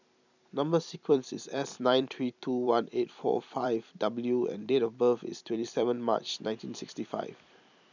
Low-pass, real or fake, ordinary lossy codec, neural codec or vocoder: 7.2 kHz; fake; none; vocoder, 44.1 kHz, 128 mel bands every 256 samples, BigVGAN v2